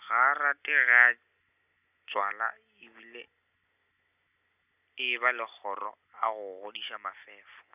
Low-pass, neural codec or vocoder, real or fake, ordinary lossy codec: 3.6 kHz; none; real; none